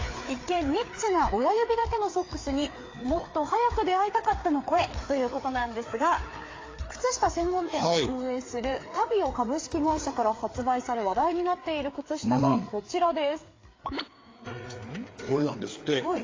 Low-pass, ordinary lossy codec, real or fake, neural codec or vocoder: 7.2 kHz; AAC, 32 kbps; fake; codec, 16 kHz, 4 kbps, FreqCodec, larger model